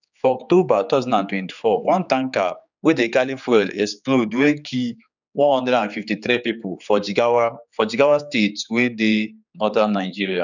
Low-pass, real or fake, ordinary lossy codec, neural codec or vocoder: 7.2 kHz; fake; none; codec, 16 kHz, 4 kbps, X-Codec, HuBERT features, trained on general audio